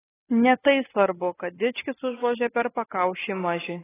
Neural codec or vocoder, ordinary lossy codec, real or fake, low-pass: none; AAC, 16 kbps; real; 3.6 kHz